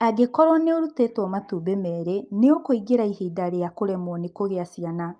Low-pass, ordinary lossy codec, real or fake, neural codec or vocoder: 9.9 kHz; Opus, 32 kbps; real; none